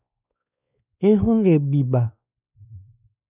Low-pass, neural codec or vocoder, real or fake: 3.6 kHz; codec, 16 kHz, 2 kbps, X-Codec, WavLM features, trained on Multilingual LibriSpeech; fake